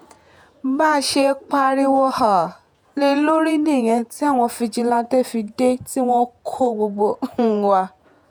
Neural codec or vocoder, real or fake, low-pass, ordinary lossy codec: vocoder, 48 kHz, 128 mel bands, Vocos; fake; none; none